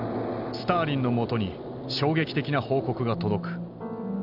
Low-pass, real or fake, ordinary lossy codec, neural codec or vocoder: 5.4 kHz; real; none; none